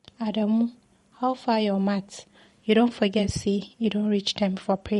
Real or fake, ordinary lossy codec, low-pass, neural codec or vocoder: fake; MP3, 48 kbps; 19.8 kHz; vocoder, 44.1 kHz, 128 mel bands every 512 samples, BigVGAN v2